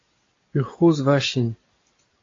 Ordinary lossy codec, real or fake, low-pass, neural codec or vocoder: AAC, 32 kbps; real; 7.2 kHz; none